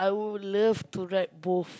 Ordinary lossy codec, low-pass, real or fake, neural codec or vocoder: none; none; real; none